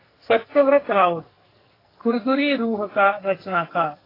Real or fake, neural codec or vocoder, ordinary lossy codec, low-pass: fake; codec, 44.1 kHz, 2.6 kbps, SNAC; AAC, 24 kbps; 5.4 kHz